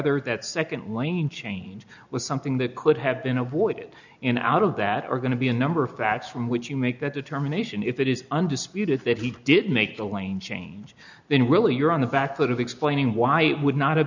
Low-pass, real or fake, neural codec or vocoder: 7.2 kHz; real; none